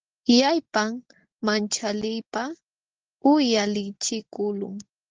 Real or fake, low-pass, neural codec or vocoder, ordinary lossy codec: real; 7.2 kHz; none; Opus, 16 kbps